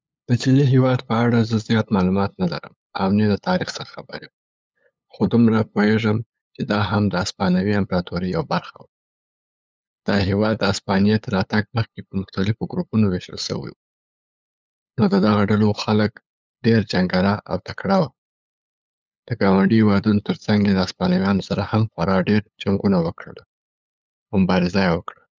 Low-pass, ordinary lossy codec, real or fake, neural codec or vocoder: none; none; fake; codec, 16 kHz, 8 kbps, FunCodec, trained on LibriTTS, 25 frames a second